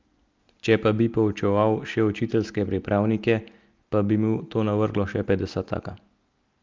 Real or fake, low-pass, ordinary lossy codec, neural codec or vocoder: real; 7.2 kHz; Opus, 32 kbps; none